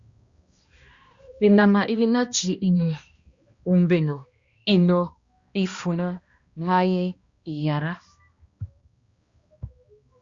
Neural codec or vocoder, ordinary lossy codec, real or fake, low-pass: codec, 16 kHz, 1 kbps, X-Codec, HuBERT features, trained on balanced general audio; Opus, 64 kbps; fake; 7.2 kHz